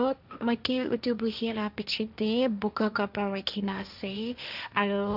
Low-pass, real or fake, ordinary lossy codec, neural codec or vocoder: 5.4 kHz; fake; none; codec, 16 kHz, 1.1 kbps, Voila-Tokenizer